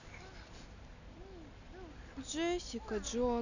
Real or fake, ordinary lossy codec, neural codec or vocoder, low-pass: real; none; none; 7.2 kHz